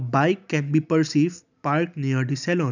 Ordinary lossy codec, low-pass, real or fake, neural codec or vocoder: none; 7.2 kHz; real; none